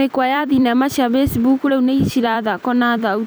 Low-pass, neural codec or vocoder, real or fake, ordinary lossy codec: none; vocoder, 44.1 kHz, 128 mel bands every 256 samples, BigVGAN v2; fake; none